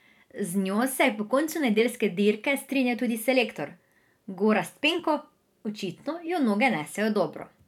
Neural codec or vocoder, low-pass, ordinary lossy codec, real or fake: vocoder, 44.1 kHz, 128 mel bands every 512 samples, BigVGAN v2; 19.8 kHz; none; fake